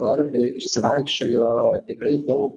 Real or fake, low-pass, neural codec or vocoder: fake; 10.8 kHz; codec, 24 kHz, 1.5 kbps, HILCodec